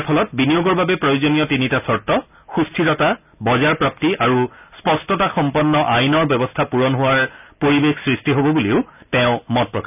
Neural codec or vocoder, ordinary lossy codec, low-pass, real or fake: none; none; 3.6 kHz; real